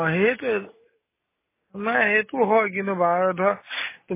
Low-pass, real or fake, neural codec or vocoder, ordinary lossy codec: 3.6 kHz; real; none; MP3, 16 kbps